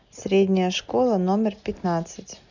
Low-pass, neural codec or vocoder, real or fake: 7.2 kHz; none; real